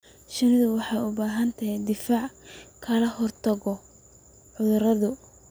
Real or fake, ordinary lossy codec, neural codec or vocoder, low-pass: real; none; none; none